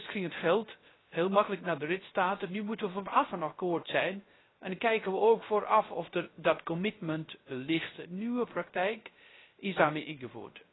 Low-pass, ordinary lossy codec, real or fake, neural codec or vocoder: 7.2 kHz; AAC, 16 kbps; fake; codec, 16 kHz, 0.3 kbps, FocalCodec